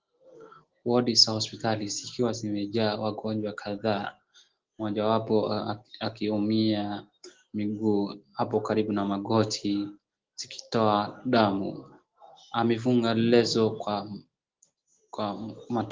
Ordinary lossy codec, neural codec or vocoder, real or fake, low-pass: Opus, 16 kbps; none; real; 7.2 kHz